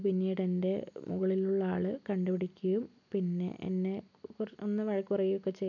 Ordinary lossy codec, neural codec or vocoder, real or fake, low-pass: none; none; real; 7.2 kHz